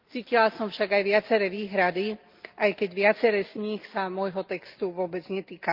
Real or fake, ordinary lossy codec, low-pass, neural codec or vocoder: real; Opus, 24 kbps; 5.4 kHz; none